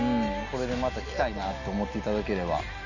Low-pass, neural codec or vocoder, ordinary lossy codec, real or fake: 7.2 kHz; none; none; real